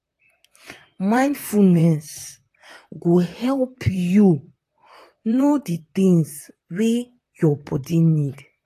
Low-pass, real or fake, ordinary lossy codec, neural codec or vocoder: 14.4 kHz; fake; AAC, 48 kbps; vocoder, 44.1 kHz, 128 mel bands, Pupu-Vocoder